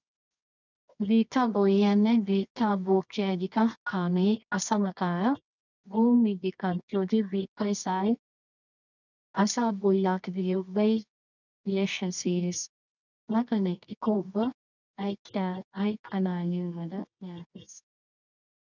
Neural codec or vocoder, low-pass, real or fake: codec, 24 kHz, 0.9 kbps, WavTokenizer, medium music audio release; 7.2 kHz; fake